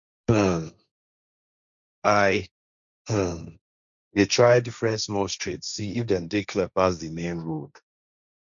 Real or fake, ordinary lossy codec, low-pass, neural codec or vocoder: fake; none; 7.2 kHz; codec, 16 kHz, 1.1 kbps, Voila-Tokenizer